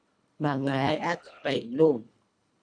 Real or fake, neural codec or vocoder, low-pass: fake; codec, 24 kHz, 1.5 kbps, HILCodec; 9.9 kHz